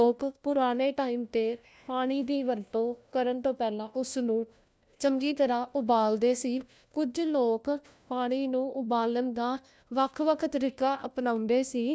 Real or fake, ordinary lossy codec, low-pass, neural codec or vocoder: fake; none; none; codec, 16 kHz, 1 kbps, FunCodec, trained on LibriTTS, 50 frames a second